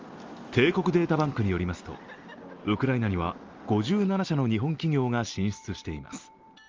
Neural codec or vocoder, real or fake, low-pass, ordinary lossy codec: none; real; 7.2 kHz; Opus, 32 kbps